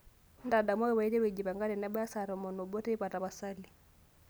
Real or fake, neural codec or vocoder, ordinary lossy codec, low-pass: real; none; none; none